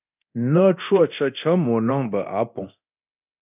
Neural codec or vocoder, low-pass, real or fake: codec, 24 kHz, 0.9 kbps, DualCodec; 3.6 kHz; fake